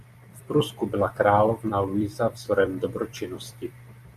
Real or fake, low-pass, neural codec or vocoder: fake; 14.4 kHz; vocoder, 44.1 kHz, 128 mel bands every 256 samples, BigVGAN v2